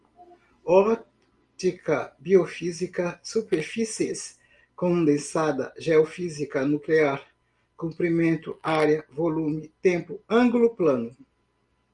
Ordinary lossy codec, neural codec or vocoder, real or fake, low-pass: Opus, 32 kbps; none; real; 10.8 kHz